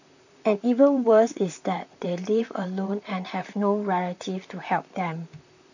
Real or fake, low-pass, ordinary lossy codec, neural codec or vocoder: fake; 7.2 kHz; none; vocoder, 44.1 kHz, 128 mel bands, Pupu-Vocoder